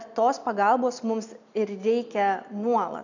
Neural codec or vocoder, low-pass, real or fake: none; 7.2 kHz; real